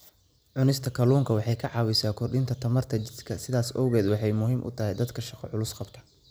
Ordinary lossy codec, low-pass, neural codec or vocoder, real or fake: none; none; none; real